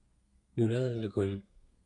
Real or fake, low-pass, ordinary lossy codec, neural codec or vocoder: fake; 10.8 kHz; MP3, 64 kbps; codec, 32 kHz, 1.9 kbps, SNAC